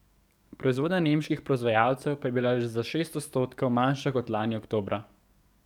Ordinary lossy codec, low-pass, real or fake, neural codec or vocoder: none; 19.8 kHz; fake; codec, 44.1 kHz, 7.8 kbps, DAC